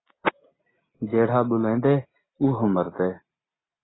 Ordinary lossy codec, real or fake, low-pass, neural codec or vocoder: AAC, 16 kbps; real; 7.2 kHz; none